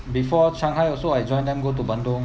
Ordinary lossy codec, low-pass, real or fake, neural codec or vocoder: none; none; real; none